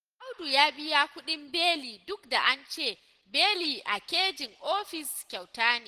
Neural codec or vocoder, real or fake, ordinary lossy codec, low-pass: none; real; Opus, 16 kbps; 14.4 kHz